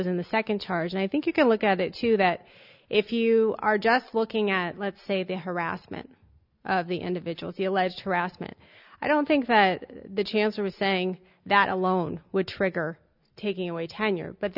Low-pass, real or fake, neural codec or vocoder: 5.4 kHz; real; none